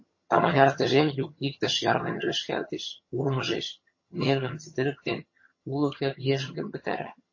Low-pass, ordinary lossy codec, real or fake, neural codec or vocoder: 7.2 kHz; MP3, 32 kbps; fake; vocoder, 22.05 kHz, 80 mel bands, HiFi-GAN